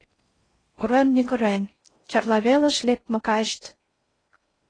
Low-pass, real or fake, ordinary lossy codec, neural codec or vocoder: 9.9 kHz; fake; AAC, 32 kbps; codec, 16 kHz in and 24 kHz out, 0.6 kbps, FocalCodec, streaming, 4096 codes